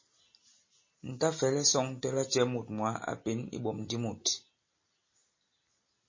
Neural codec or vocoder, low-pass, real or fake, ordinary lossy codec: none; 7.2 kHz; real; MP3, 32 kbps